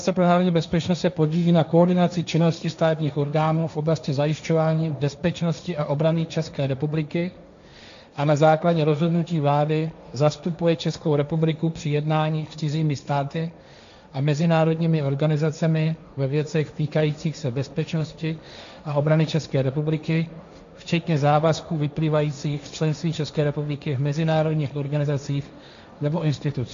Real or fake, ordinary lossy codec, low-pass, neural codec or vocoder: fake; MP3, 64 kbps; 7.2 kHz; codec, 16 kHz, 1.1 kbps, Voila-Tokenizer